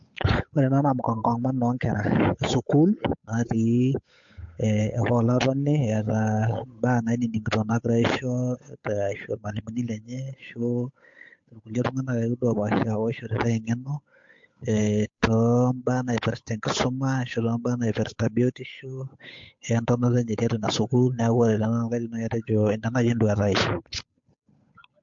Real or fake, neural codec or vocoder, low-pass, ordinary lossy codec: fake; codec, 16 kHz, 8 kbps, FunCodec, trained on Chinese and English, 25 frames a second; 7.2 kHz; MP3, 48 kbps